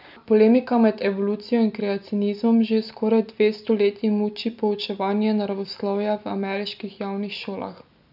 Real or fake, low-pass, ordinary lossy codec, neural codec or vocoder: real; 5.4 kHz; none; none